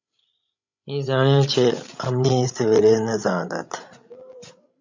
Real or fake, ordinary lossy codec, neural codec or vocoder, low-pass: fake; MP3, 64 kbps; codec, 16 kHz, 16 kbps, FreqCodec, larger model; 7.2 kHz